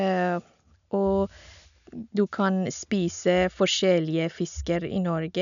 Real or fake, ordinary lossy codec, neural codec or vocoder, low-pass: real; none; none; 7.2 kHz